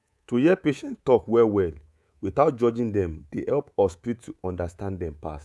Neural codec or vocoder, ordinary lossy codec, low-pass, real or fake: codec, 24 kHz, 3.1 kbps, DualCodec; none; none; fake